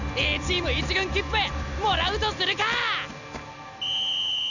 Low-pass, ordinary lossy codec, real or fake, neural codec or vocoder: 7.2 kHz; none; real; none